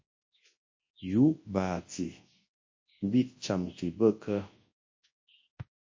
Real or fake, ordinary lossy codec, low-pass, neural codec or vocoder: fake; MP3, 32 kbps; 7.2 kHz; codec, 24 kHz, 0.9 kbps, WavTokenizer, large speech release